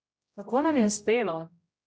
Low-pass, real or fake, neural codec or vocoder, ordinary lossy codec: none; fake; codec, 16 kHz, 0.5 kbps, X-Codec, HuBERT features, trained on general audio; none